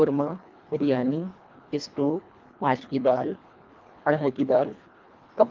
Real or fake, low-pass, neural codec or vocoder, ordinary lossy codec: fake; 7.2 kHz; codec, 24 kHz, 1.5 kbps, HILCodec; Opus, 24 kbps